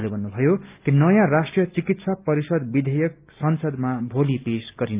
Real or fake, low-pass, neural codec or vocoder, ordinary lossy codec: real; 3.6 kHz; none; Opus, 24 kbps